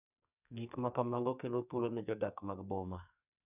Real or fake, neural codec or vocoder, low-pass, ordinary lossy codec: fake; codec, 44.1 kHz, 2.6 kbps, SNAC; 3.6 kHz; none